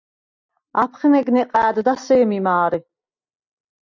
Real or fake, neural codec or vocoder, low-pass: real; none; 7.2 kHz